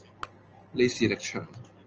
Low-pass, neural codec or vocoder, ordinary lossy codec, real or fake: 7.2 kHz; none; Opus, 24 kbps; real